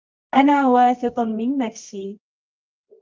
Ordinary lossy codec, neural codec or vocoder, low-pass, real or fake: Opus, 24 kbps; codec, 24 kHz, 0.9 kbps, WavTokenizer, medium music audio release; 7.2 kHz; fake